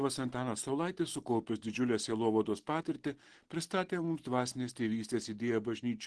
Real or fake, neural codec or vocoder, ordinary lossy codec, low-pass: real; none; Opus, 16 kbps; 10.8 kHz